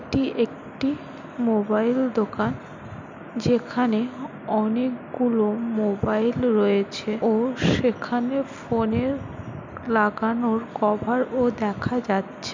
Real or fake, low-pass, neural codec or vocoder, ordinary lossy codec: real; 7.2 kHz; none; MP3, 64 kbps